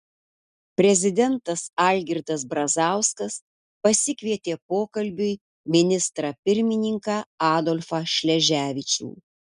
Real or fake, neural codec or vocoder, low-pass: real; none; 10.8 kHz